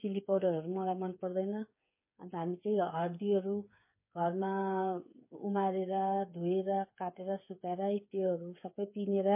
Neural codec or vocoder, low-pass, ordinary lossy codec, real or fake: codec, 16 kHz, 8 kbps, FreqCodec, smaller model; 3.6 kHz; MP3, 24 kbps; fake